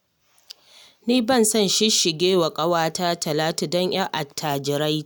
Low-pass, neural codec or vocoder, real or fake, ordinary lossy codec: none; none; real; none